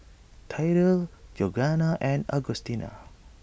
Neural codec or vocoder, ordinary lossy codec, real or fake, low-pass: none; none; real; none